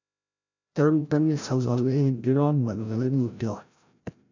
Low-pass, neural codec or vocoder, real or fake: 7.2 kHz; codec, 16 kHz, 0.5 kbps, FreqCodec, larger model; fake